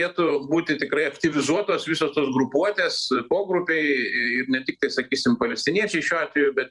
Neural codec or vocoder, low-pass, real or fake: vocoder, 44.1 kHz, 128 mel bands every 512 samples, BigVGAN v2; 10.8 kHz; fake